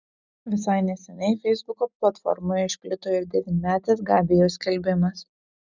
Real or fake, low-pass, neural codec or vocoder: real; 7.2 kHz; none